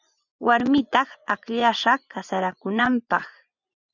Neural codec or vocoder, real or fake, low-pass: vocoder, 24 kHz, 100 mel bands, Vocos; fake; 7.2 kHz